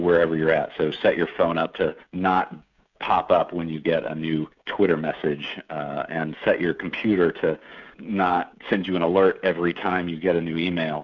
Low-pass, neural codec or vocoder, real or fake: 7.2 kHz; codec, 16 kHz, 16 kbps, FreqCodec, smaller model; fake